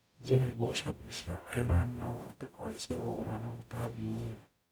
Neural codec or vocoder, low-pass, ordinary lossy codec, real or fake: codec, 44.1 kHz, 0.9 kbps, DAC; none; none; fake